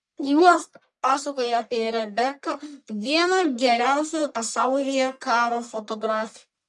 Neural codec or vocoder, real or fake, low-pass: codec, 44.1 kHz, 1.7 kbps, Pupu-Codec; fake; 10.8 kHz